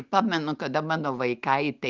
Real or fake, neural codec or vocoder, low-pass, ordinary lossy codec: real; none; 7.2 kHz; Opus, 24 kbps